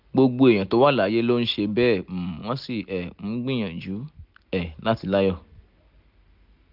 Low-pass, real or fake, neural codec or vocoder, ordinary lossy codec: 5.4 kHz; real; none; none